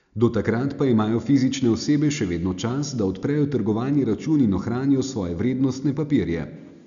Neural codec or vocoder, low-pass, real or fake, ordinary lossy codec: none; 7.2 kHz; real; none